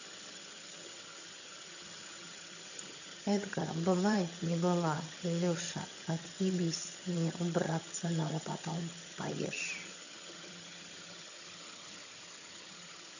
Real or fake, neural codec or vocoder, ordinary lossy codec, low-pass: fake; vocoder, 22.05 kHz, 80 mel bands, HiFi-GAN; none; 7.2 kHz